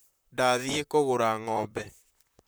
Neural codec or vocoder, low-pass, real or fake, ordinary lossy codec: vocoder, 44.1 kHz, 128 mel bands, Pupu-Vocoder; none; fake; none